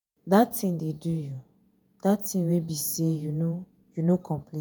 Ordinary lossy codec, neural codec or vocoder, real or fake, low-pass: none; vocoder, 48 kHz, 128 mel bands, Vocos; fake; none